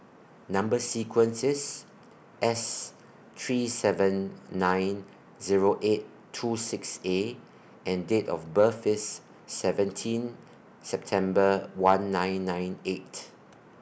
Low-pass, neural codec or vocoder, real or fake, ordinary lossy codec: none; none; real; none